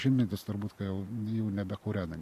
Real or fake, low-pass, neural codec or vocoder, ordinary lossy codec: fake; 14.4 kHz; vocoder, 44.1 kHz, 128 mel bands every 512 samples, BigVGAN v2; MP3, 64 kbps